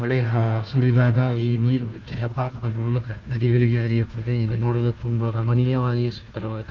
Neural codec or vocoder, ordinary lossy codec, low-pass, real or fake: codec, 16 kHz, 1 kbps, FunCodec, trained on Chinese and English, 50 frames a second; Opus, 24 kbps; 7.2 kHz; fake